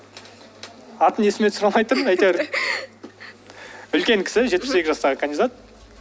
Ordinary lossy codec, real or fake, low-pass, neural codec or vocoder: none; real; none; none